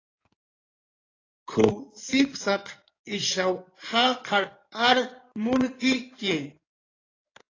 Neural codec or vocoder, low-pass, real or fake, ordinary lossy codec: codec, 16 kHz in and 24 kHz out, 2.2 kbps, FireRedTTS-2 codec; 7.2 kHz; fake; AAC, 32 kbps